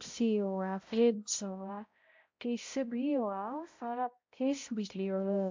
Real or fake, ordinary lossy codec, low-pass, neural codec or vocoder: fake; MP3, 64 kbps; 7.2 kHz; codec, 16 kHz, 0.5 kbps, X-Codec, HuBERT features, trained on balanced general audio